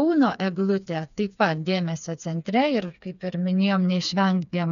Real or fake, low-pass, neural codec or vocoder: fake; 7.2 kHz; codec, 16 kHz, 4 kbps, FreqCodec, smaller model